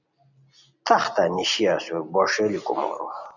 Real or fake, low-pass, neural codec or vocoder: real; 7.2 kHz; none